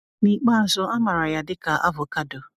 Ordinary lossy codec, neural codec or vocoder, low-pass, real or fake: none; none; 14.4 kHz; real